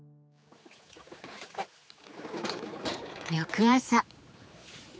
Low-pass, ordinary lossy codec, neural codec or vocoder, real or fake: none; none; codec, 16 kHz, 4 kbps, X-Codec, HuBERT features, trained on general audio; fake